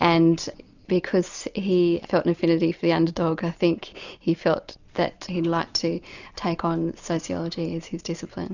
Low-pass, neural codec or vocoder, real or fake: 7.2 kHz; none; real